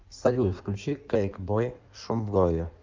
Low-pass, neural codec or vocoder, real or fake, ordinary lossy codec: 7.2 kHz; codec, 16 kHz in and 24 kHz out, 1.1 kbps, FireRedTTS-2 codec; fake; Opus, 24 kbps